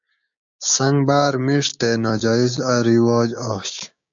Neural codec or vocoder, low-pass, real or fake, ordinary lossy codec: codec, 16 kHz, 6 kbps, DAC; 7.2 kHz; fake; AAC, 64 kbps